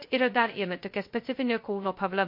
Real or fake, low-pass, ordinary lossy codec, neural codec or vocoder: fake; 5.4 kHz; MP3, 32 kbps; codec, 16 kHz, 0.2 kbps, FocalCodec